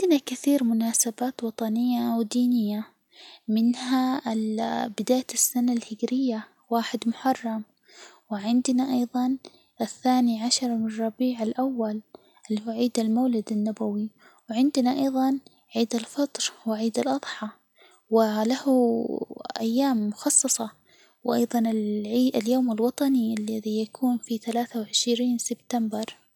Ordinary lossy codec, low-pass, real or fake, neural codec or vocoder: none; 19.8 kHz; real; none